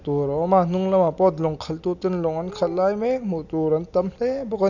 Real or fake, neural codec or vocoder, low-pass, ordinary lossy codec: real; none; 7.2 kHz; none